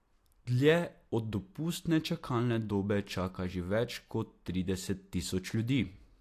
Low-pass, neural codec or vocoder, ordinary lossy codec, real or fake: 14.4 kHz; none; AAC, 64 kbps; real